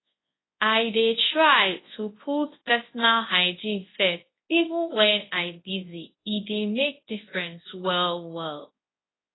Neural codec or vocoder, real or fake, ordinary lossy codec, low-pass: codec, 24 kHz, 0.9 kbps, WavTokenizer, large speech release; fake; AAC, 16 kbps; 7.2 kHz